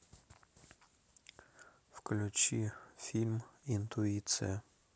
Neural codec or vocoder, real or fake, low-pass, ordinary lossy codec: none; real; none; none